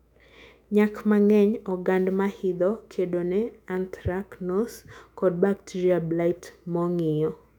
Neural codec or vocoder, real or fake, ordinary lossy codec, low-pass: autoencoder, 48 kHz, 128 numbers a frame, DAC-VAE, trained on Japanese speech; fake; none; 19.8 kHz